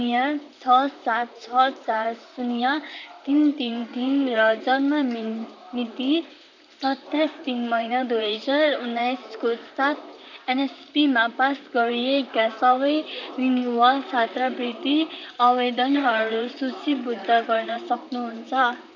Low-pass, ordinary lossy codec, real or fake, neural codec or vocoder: 7.2 kHz; none; fake; vocoder, 44.1 kHz, 128 mel bands, Pupu-Vocoder